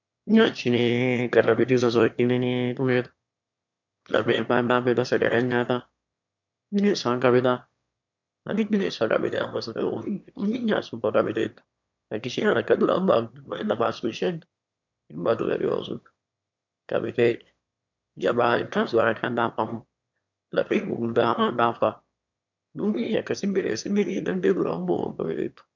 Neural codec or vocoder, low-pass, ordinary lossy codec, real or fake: autoencoder, 22.05 kHz, a latent of 192 numbers a frame, VITS, trained on one speaker; 7.2 kHz; MP3, 64 kbps; fake